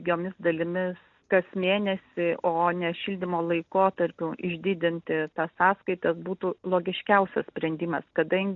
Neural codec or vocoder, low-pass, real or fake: none; 7.2 kHz; real